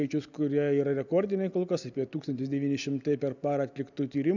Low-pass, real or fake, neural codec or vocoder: 7.2 kHz; real; none